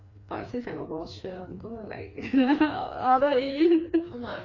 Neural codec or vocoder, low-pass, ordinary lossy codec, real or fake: codec, 16 kHz, 2 kbps, FreqCodec, larger model; 7.2 kHz; AAC, 32 kbps; fake